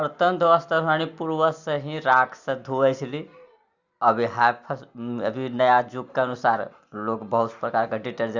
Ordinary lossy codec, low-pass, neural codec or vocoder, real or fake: Opus, 64 kbps; 7.2 kHz; none; real